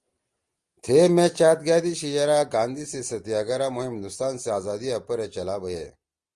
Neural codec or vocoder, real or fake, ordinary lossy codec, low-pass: none; real; Opus, 24 kbps; 10.8 kHz